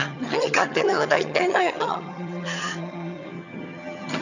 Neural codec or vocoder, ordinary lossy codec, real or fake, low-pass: vocoder, 22.05 kHz, 80 mel bands, HiFi-GAN; none; fake; 7.2 kHz